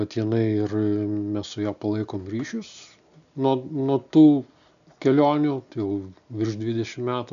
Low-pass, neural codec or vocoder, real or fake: 7.2 kHz; none; real